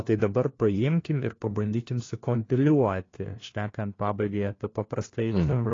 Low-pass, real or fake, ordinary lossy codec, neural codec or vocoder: 7.2 kHz; fake; AAC, 32 kbps; codec, 16 kHz, 1 kbps, FunCodec, trained on LibriTTS, 50 frames a second